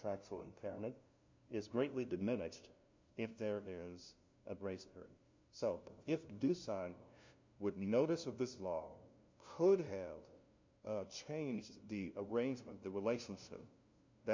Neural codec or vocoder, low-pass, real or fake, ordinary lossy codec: codec, 16 kHz, 0.5 kbps, FunCodec, trained on LibriTTS, 25 frames a second; 7.2 kHz; fake; MP3, 48 kbps